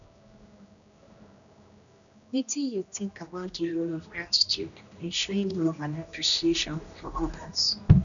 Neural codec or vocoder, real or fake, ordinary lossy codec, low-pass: codec, 16 kHz, 1 kbps, X-Codec, HuBERT features, trained on general audio; fake; none; 7.2 kHz